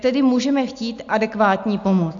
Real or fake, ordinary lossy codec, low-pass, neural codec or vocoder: real; AAC, 64 kbps; 7.2 kHz; none